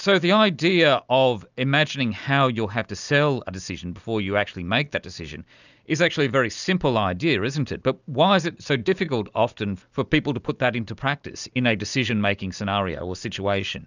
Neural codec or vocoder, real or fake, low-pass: none; real; 7.2 kHz